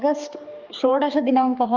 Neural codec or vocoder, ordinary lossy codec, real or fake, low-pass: codec, 16 kHz, 2 kbps, X-Codec, HuBERT features, trained on general audio; Opus, 24 kbps; fake; 7.2 kHz